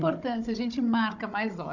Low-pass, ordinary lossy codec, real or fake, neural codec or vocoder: 7.2 kHz; none; fake; codec, 16 kHz, 16 kbps, FunCodec, trained on Chinese and English, 50 frames a second